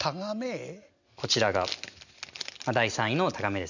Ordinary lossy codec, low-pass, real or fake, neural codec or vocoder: none; 7.2 kHz; real; none